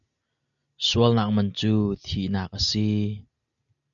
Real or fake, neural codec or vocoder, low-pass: real; none; 7.2 kHz